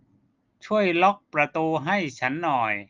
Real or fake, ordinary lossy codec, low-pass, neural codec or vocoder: real; Opus, 32 kbps; 7.2 kHz; none